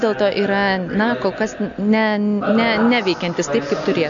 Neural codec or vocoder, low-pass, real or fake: none; 7.2 kHz; real